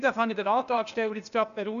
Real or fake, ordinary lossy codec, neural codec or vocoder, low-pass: fake; none; codec, 16 kHz, 0.8 kbps, ZipCodec; 7.2 kHz